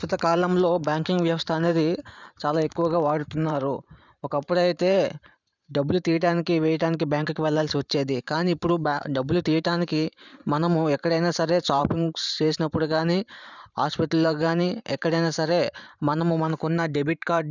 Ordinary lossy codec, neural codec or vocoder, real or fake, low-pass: none; vocoder, 44.1 kHz, 128 mel bands every 512 samples, BigVGAN v2; fake; 7.2 kHz